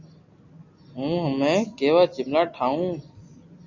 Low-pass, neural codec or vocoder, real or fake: 7.2 kHz; none; real